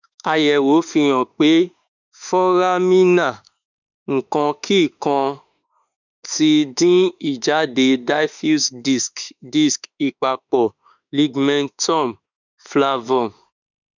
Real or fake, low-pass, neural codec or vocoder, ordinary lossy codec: fake; 7.2 kHz; autoencoder, 48 kHz, 32 numbers a frame, DAC-VAE, trained on Japanese speech; none